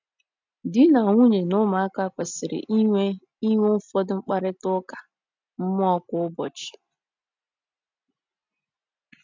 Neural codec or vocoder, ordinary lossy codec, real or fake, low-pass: none; MP3, 64 kbps; real; 7.2 kHz